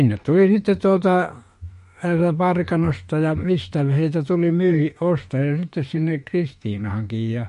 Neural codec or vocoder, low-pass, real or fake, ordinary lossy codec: autoencoder, 48 kHz, 32 numbers a frame, DAC-VAE, trained on Japanese speech; 14.4 kHz; fake; MP3, 48 kbps